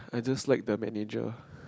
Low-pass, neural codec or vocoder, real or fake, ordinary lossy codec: none; none; real; none